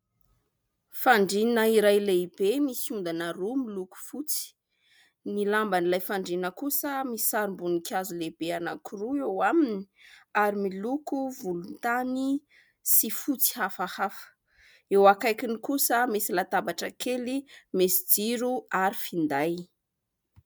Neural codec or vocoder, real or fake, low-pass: none; real; 19.8 kHz